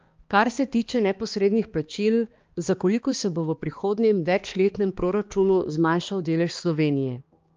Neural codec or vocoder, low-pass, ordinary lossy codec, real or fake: codec, 16 kHz, 2 kbps, X-Codec, HuBERT features, trained on balanced general audio; 7.2 kHz; Opus, 24 kbps; fake